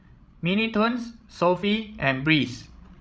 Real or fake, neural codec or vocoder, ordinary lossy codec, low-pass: fake; codec, 16 kHz, 16 kbps, FreqCodec, larger model; none; none